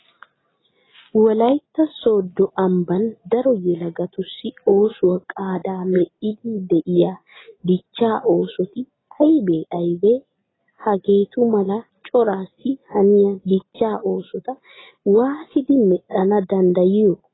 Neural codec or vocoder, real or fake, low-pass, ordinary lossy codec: none; real; 7.2 kHz; AAC, 16 kbps